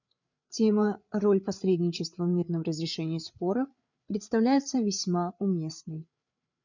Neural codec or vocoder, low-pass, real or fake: codec, 16 kHz, 8 kbps, FreqCodec, larger model; 7.2 kHz; fake